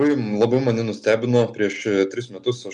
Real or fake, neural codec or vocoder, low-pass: real; none; 10.8 kHz